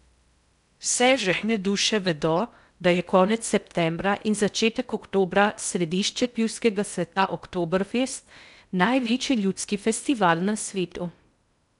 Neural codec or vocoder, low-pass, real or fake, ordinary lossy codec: codec, 16 kHz in and 24 kHz out, 0.6 kbps, FocalCodec, streaming, 2048 codes; 10.8 kHz; fake; none